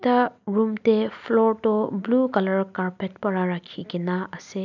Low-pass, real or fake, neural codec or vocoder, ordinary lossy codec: 7.2 kHz; real; none; none